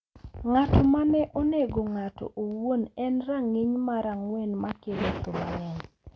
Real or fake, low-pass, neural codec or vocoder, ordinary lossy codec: real; none; none; none